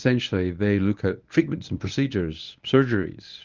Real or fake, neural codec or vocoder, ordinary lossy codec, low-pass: fake; codec, 24 kHz, 0.9 kbps, WavTokenizer, medium speech release version 1; Opus, 24 kbps; 7.2 kHz